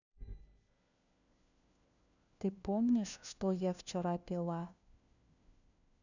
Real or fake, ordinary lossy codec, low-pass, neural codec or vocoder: fake; none; 7.2 kHz; codec, 16 kHz, 1 kbps, FunCodec, trained on LibriTTS, 50 frames a second